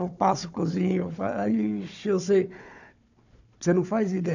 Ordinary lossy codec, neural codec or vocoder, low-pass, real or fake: none; codec, 16 kHz, 4 kbps, FunCodec, trained on Chinese and English, 50 frames a second; 7.2 kHz; fake